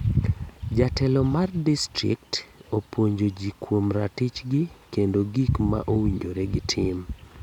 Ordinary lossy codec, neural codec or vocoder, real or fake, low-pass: none; none; real; 19.8 kHz